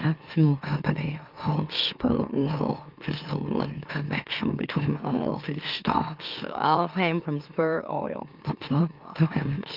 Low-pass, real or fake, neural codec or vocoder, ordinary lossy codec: 5.4 kHz; fake; autoencoder, 44.1 kHz, a latent of 192 numbers a frame, MeloTTS; Opus, 32 kbps